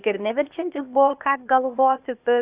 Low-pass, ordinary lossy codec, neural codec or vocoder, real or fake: 3.6 kHz; Opus, 64 kbps; codec, 16 kHz, 0.8 kbps, ZipCodec; fake